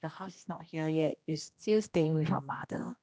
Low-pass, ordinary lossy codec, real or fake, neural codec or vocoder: none; none; fake; codec, 16 kHz, 1 kbps, X-Codec, HuBERT features, trained on general audio